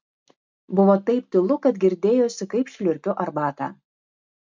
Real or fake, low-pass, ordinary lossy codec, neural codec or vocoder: real; 7.2 kHz; MP3, 64 kbps; none